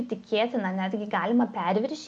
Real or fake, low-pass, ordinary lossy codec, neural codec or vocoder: real; 10.8 kHz; MP3, 48 kbps; none